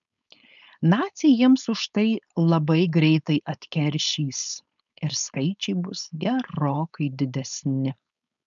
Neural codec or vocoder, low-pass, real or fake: codec, 16 kHz, 4.8 kbps, FACodec; 7.2 kHz; fake